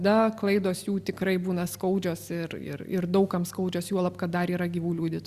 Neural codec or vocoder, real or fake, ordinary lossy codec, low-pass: none; real; Opus, 64 kbps; 14.4 kHz